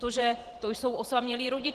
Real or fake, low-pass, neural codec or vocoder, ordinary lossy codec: fake; 14.4 kHz; vocoder, 44.1 kHz, 128 mel bands every 512 samples, BigVGAN v2; Opus, 16 kbps